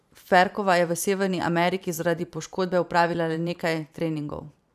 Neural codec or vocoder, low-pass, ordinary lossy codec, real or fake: vocoder, 44.1 kHz, 128 mel bands every 512 samples, BigVGAN v2; 14.4 kHz; none; fake